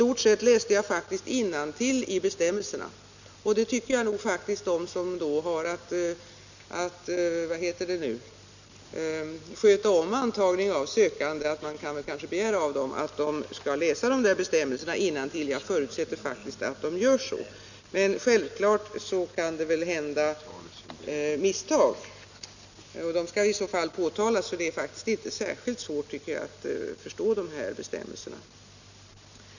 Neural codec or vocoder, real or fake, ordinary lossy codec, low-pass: none; real; none; 7.2 kHz